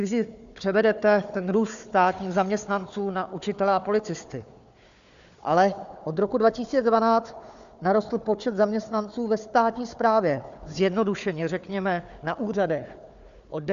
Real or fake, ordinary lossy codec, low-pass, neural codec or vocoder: fake; AAC, 96 kbps; 7.2 kHz; codec, 16 kHz, 4 kbps, FunCodec, trained on Chinese and English, 50 frames a second